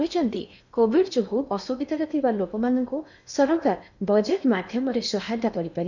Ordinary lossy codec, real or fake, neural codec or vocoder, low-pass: none; fake; codec, 16 kHz in and 24 kHz out, 0.8 kbps, FocalCodec, streaming, 65536 codes; 7.2 kHz